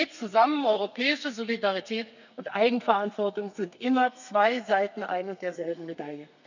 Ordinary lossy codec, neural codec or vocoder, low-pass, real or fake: none; codec, 32 kHz, 1.9 kbps, SNAC; 7.2 kHz; fake